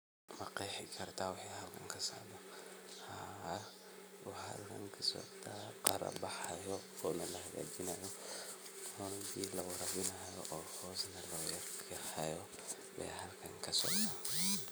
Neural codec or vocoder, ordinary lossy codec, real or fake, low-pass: none; none; real; none